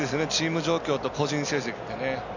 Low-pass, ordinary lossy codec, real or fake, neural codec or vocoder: 7.2 kHz; none; real; none